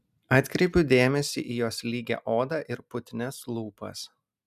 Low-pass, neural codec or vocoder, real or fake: 14.4 kHz; none; real